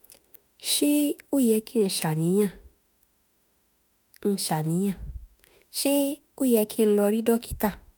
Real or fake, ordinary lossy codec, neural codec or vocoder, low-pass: fake; none; autoencoder, 48 kHz, 32 numbers a frame, DAC-VAE, trained on Japanese speech; none